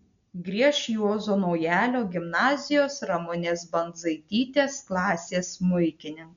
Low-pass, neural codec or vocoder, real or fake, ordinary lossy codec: 7.2 kHz; none; real; MP3, 96 kbps